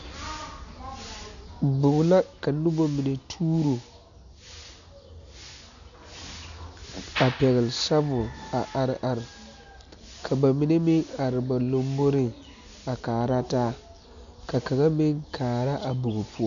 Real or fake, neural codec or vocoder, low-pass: real; none; 7.2 kHz